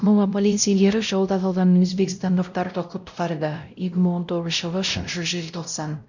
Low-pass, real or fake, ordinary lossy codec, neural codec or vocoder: 7.2 kHz; fake; none; codec, 16 kHz, 0.5 kbps, X-Codec, WavLM features, trained on Multilingual LibriSpeech